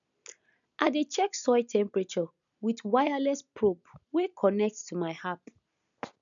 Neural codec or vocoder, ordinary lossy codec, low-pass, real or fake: none; none; 7.2 kHz; real